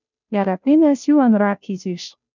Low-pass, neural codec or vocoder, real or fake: 7.2 kHz; codec, 16 kHz, 0.5 kbps, FunCodec, trained on Chinese and English, 25 frames a second; fake